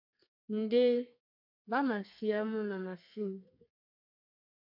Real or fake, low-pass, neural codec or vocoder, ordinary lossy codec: fake; 5.4 kHz; codec, 32 kHz, 1.9 kbps, SNAC; MP3, 48 kbps